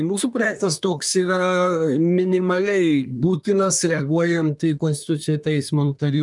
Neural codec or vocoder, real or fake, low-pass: codec, 24 kHz, 1 kbps, SNAC; fake; 10.8 kHz